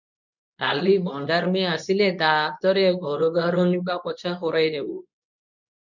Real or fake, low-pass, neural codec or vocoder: fake; 7.2 kHz; codec, 24 kHz, 0.9 kbps, WavTokenizer, medium speech release version 2